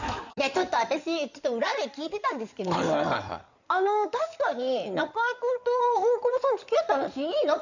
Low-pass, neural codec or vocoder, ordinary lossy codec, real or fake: 7.2 kHz; codec, 16 kHz in and 24 kHz out, 2.2 kbps, FireRedTTS-2 codec; none; fake